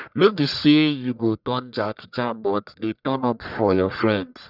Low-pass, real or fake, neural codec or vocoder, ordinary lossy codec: 5.4 kHz; fake; codec, 44.1 kHz, 1.7 kbps, Pupu-Codec; none